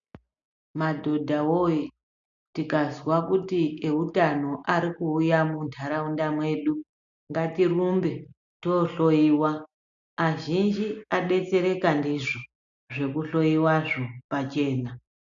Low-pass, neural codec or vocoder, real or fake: 7.2 kHz; none; real